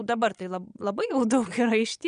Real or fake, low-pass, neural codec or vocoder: fake; 9.9 kHz; vocoder, 22.05 kHz, 80 mel bands, Vocos